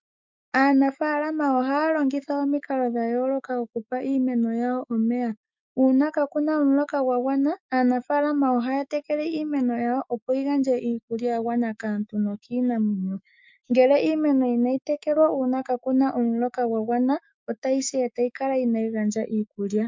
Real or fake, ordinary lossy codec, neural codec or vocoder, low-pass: fake; MP3, 64 kbps; autoencoder, 48 kHz, 128 numbers a frame, DAC-VAE, trained on Japanese speech; 7.2 kHz